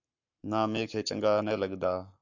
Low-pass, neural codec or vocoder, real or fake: 7.2 kHz; codec, 44.1 kHz, 7.8 kbps, Pupu-Codec; fake